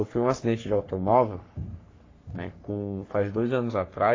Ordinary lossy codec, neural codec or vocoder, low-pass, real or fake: AAC, 32 kbps; codec, 44.1 kHz, 3.4 kbps, Pupu-Codec; 7.2 kHz; fake